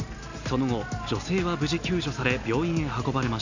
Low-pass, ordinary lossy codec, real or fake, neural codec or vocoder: 7.2 kHz; none; real; none